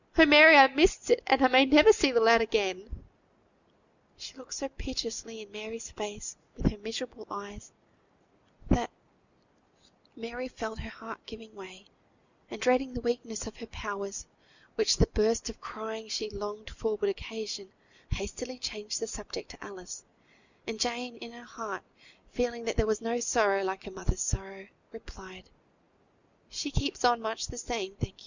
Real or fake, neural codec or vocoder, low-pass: real; none; 7.2 kHz